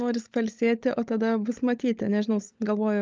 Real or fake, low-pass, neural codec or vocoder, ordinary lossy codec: fake; 7.2 kHz; codec, 16 kHz, 16 kbps, FunCodec, trained on LibriTTS, 50 frames a second; Opus, 24 kbps